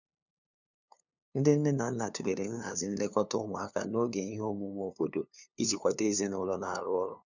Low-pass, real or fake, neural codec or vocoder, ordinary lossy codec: 7.2 kHz; fake; codec, 16 kHz, 2 kbps, FunCodec, trained on LibriTTS, 25 frames a second; none